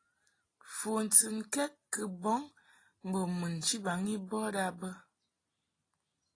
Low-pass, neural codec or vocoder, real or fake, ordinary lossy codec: 9.9 kHz; none; real; AAC, 32 kbps